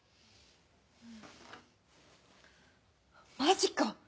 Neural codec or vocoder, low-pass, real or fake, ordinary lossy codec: none; none; real; none